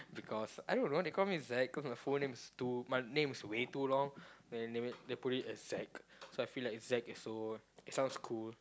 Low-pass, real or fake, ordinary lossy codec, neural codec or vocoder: none; real; none; none